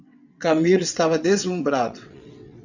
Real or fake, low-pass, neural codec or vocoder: fake; 7.2 kHz; vocoder, 22.05 kHz, 80 mel bands, WaveNeXt